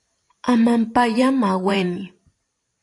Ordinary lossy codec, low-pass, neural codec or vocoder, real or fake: AAC, 48 kbps; 10.8 kHz; vocoder, 44.1 kHz, 128 mel bands every 512 samples, BigVGAN v2; fake